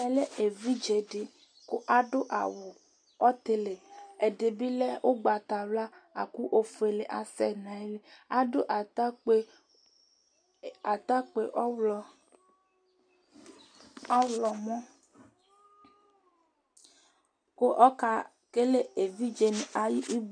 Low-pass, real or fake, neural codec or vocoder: 9.9 kHz; real; none